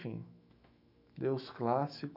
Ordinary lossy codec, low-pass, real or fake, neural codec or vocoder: none; 5.4 kHz; fake; autoencoder, 48 kHz, 128 numbers a frame, DAC-VAE, trained on Japanese speech